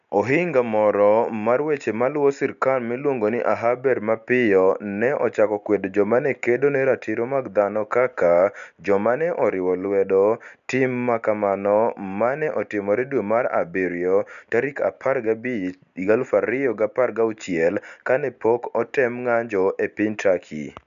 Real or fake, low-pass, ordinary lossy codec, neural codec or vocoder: real; 7.2 kHz; none; none